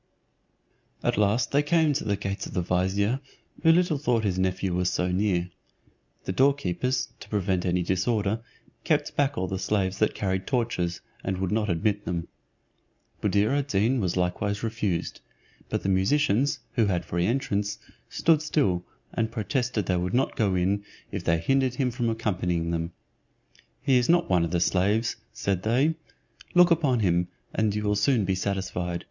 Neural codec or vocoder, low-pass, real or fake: none; 7.2 kHz; real